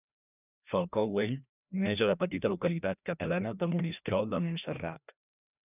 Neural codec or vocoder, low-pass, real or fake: codec, 16 kHz, 1 kbps, FreqCodec, larger model; 3.6 kHz; fake